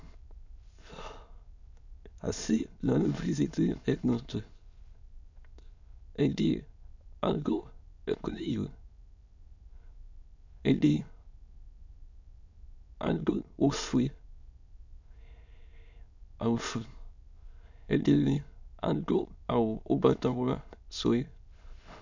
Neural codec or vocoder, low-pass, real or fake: autoencoder, 22.05 kHz, a latent of 192 numbers a frame, VITS, trained on many speakers; 7.2 kHz; fake